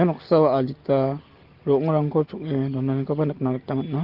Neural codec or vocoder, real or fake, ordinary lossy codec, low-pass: none; real; Opus, 16 kbps; 5.4 kHz